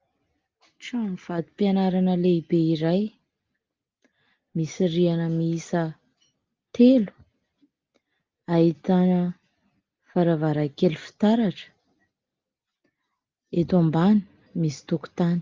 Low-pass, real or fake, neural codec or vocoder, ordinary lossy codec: 7.2 kHz; real; none; Opus, 24 kbps